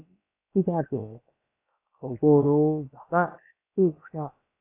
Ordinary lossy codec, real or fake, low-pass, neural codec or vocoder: AAC, 24 kbps; fake; 3.6 kHz; codec, 16 kHz, about 1 kbps, DyCAST, with the encoder's durations